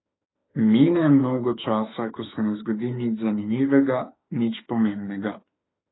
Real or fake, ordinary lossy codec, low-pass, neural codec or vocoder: fake; AAC, 16 kbps; 7.2 kHz; autoencoder, 48 kHz, 32 numbers a frame, DAC-VAE, trained on Japanese speech